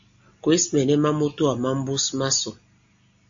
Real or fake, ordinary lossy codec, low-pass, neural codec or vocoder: real; AAC, 64 kbps; 7.2 kHz; none